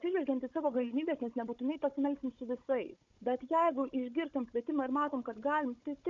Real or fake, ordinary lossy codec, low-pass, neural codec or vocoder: fake; MP3, 48 kbps; 7.2 kHz; codec, 16 kHz, 16 kbps, FunCodec, trained on Chinese and English, 50 frames a second